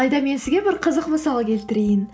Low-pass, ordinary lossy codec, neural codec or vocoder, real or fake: none; none; none; real